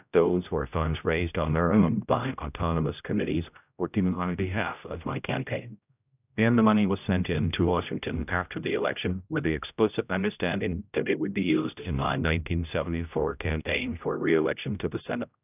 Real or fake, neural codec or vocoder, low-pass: fake; codec, 16 kHz, 0.5 kbps, X-Codec, HuBERT features, trained on general audio; 3.6 kHz